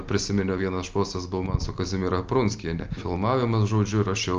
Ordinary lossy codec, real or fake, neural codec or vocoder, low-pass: Opus, 24 kbps; real; none; 7.2 kHz